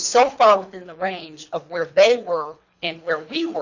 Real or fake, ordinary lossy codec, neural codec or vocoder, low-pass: fake; Opus, 64 kbps; codec, 24 kHz, 3 kbps, HILCodec; 7.2 kHz